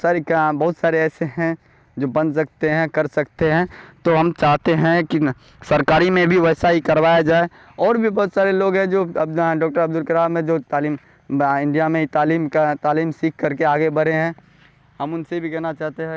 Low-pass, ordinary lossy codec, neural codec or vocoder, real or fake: none; none; none; real